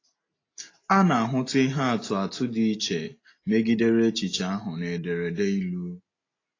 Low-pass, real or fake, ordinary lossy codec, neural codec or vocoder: 7.2 kHz; real; AAC, 32 kbps; none